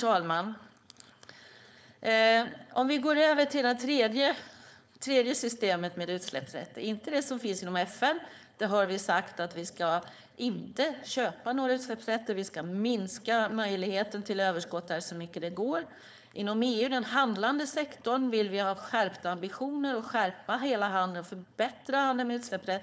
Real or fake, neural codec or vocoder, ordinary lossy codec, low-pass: fake; codec, 16 kHz, 4.8 kbps, FACodec; none; none